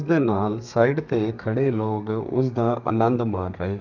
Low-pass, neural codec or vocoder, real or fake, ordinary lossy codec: 7.2 kHz; codec, 32 kHz, 1.9 kbps, SNAC; fake; none